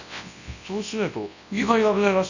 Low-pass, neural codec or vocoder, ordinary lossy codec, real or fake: 7.2 kHz; codec, 24 kHz, 0.9 kbps, WavTokenizer, large speech release; none; fake